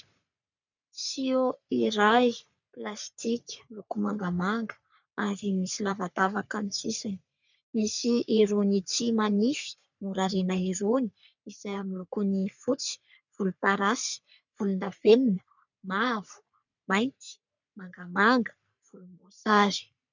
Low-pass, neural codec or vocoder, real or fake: 7.2 kHz; codec, 44.1 kHz, 3.4 kbps, Pupu-Codec; fake